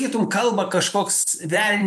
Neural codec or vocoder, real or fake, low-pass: vocoder, 44.1 kHz, 128 mel bands every 512 samples, BigVGAN v2; fake; 14.4 kHz